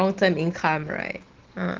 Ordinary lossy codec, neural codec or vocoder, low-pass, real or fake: Opus, 16 kbps; none; 7.2 kHz; real